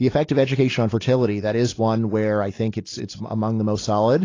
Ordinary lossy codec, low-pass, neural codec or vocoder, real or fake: AAC, 32 kbps; 7.2 kHz; none; real